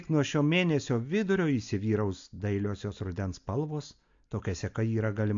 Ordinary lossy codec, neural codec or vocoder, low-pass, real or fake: AAC, 64 kbps; none; 7.2 kHz; real